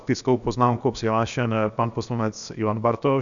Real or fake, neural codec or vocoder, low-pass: fake; codec, 16 kHz, 0.7 kbps, FocalCodec; 7.2 kHz